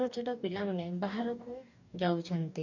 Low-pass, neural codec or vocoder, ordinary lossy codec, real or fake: 7.2 kHz; codec, 44.1 kHz, 2.6 kbps, DAC; none; fake